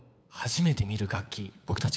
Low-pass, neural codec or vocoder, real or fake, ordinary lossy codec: none; codec, 16 kHz, 8 kbps, FunCodec, trained on LibriTTS, 25 frames a second; fake; none